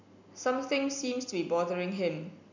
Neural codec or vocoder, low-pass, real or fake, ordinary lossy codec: none; 7.2 kHz; real; none